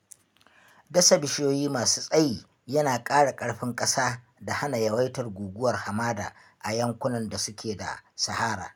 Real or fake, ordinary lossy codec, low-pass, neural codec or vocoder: real; none; none; none